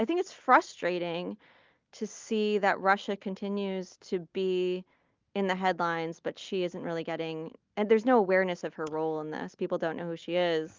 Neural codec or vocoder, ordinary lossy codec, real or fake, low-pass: none; Opus, 24 kbps; real; 7.2 kHz